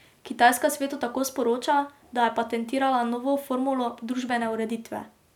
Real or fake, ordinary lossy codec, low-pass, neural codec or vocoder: real; none; 19.8 kHz; none